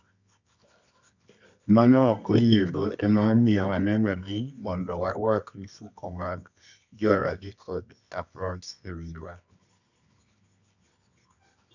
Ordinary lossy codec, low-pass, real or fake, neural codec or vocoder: none; 7.2 kHz; fake; codec, 24 kHz, 0.9 kbps, WavTokenizer, medium music audio release